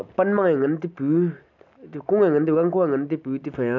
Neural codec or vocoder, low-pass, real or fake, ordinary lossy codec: none; 7.2 kHz; real; none